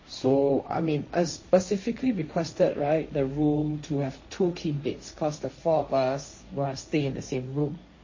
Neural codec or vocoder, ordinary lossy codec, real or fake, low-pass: codec, 16 kHz, 1.1 kbps, Voila-Tokenizer; MP3, 32 kbps; fake; 7.2 kHz